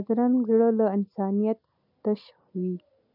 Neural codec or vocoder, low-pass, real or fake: none; 5.4 kHz; real